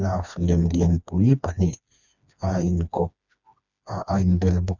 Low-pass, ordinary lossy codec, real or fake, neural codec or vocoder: 7.2 kHz; none; fake; codec, 16 kHz, 2 kbps, FreqCodec, smaller model